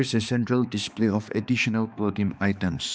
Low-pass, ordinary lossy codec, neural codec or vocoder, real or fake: none; none; codec, 16 kHz, 2 kbps, X-Codec, HuBERT features, trained on balanced general audio; fake